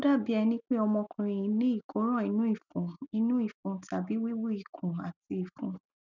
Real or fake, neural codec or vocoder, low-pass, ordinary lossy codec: real; none; 7.2 kHz; none